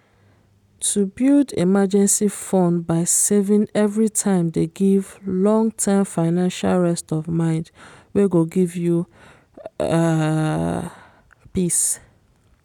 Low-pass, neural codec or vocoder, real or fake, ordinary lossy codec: 19.8 kHz; none; real; none